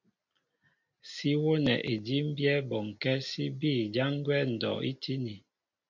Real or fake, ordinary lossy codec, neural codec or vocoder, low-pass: real; MP3, 64 kbps; none; 7.2 kHz